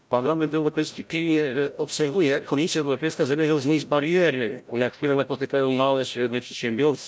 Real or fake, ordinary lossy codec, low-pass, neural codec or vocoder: fake; none; none; codec, 16 kHz, 0.5 kbps, FreqCodec, larger model